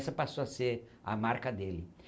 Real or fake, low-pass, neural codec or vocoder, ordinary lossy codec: real; none; none; none